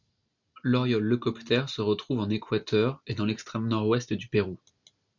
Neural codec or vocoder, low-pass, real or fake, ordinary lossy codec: none; 7.2 kHz; real; MP3, 64 kbps